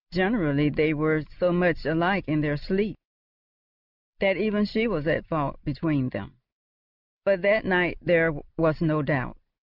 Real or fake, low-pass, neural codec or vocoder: real; 5.4 kHz; none